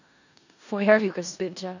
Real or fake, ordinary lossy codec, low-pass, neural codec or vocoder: fake; none; 7.2 kHz; codec, 16 kHz in and 24 kHz out, 0.4 kbps, LongCat-Audio-Codec, four codebook decoder